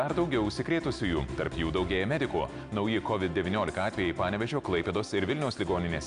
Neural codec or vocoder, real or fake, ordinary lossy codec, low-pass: none; real; Opus, 64 kbps; 9.9 kHz